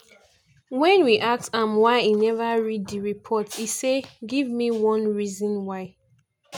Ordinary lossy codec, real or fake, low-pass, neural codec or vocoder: none; real; 19.8 kHz; none